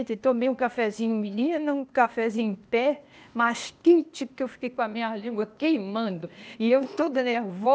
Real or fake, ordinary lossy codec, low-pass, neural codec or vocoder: fake; none; none; codec, 16 kHz, 0.8 kbps, ZipCodec